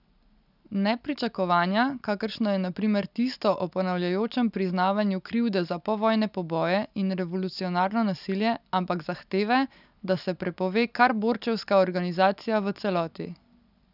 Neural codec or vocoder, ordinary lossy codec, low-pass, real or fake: none; none; 5.4 kHz; real